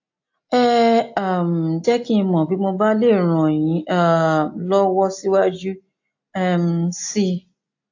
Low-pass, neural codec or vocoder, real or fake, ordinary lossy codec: 7.2 kHz; none; real; AAC, 48 kbps